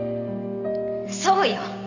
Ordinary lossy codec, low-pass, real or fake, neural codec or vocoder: none; 7.2 kHz; real; none